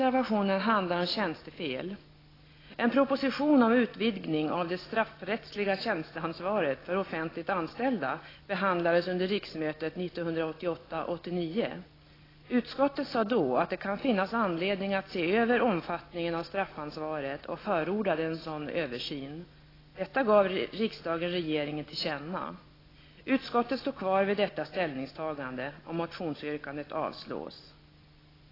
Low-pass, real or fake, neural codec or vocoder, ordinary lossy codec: 5.4 kHz; real; none; AAC, 24 kbps